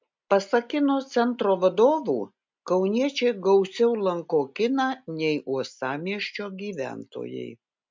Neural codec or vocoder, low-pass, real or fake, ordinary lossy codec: none; 7.2 kHz; real; MP3, 64 kbps